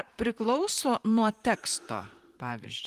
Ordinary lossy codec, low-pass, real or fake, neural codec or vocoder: Opus, 24 kbps; 14.4 kHz; fake; vocoder, 44.1 kHz, 128 mel bands, Pupu-Vocoder